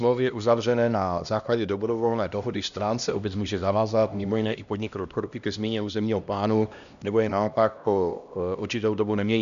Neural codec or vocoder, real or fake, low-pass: codec, 16 kHz, 1 kbps, X-Codec, HuBERT features, trained on LibriSpeech; fake; 7.2 kHz